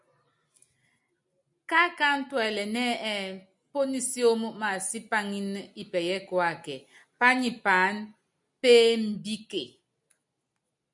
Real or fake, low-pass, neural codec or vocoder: real; 10.8 kHz; none